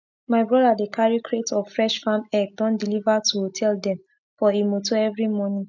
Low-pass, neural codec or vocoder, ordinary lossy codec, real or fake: 7.2 kHz; none; none; real